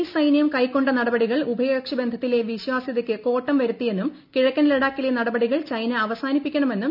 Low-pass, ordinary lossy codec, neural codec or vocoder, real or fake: 5.4 kHz; none; none; real